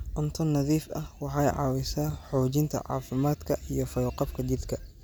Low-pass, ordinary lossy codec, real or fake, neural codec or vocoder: none; none; real; none